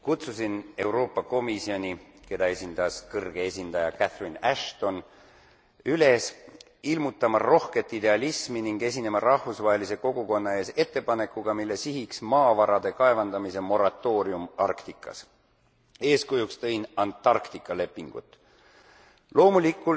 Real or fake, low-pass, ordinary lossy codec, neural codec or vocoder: real; none; none; none